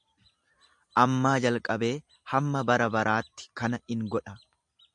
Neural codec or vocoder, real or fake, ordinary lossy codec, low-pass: none; real; MP3, 96 kbps; 10.8 kHz